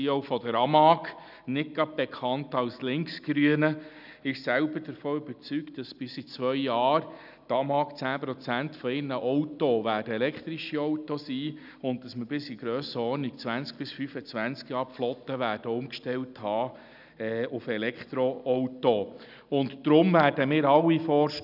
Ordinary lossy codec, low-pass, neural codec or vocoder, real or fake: none; 5.4 kHz; none; real